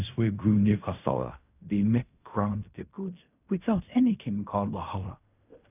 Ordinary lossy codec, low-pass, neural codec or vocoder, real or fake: none; 3.6 kHz; codec, 16 kHz in and 24 kHz out, 0.4 kbps, LongCat-Audio-Codec, fine tuned four codebook decoder; fake